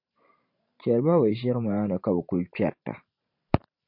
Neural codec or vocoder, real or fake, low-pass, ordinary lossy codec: vocoder, 44.1 kHz, 128 mel bands every 512 samples, BigVGAN v2; fake; 5.4 kHz; MP3, 32 kbps